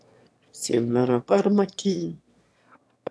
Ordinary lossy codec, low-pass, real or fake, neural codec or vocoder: none; none; fake; autoencoder, 22.05 kHz, a latent of 192 numbers a frame, VITS, trained on one speaker